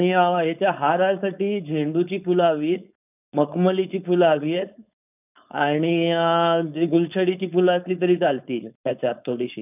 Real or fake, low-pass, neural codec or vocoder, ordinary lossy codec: fake; 3.6 kHz; codec, 16 kHz, 4.8 kbps, FACodec; none